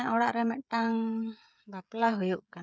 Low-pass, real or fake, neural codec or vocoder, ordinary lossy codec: none; fake; codec, 16 kHz, 16 kbps, FreqCodec, smaller model; none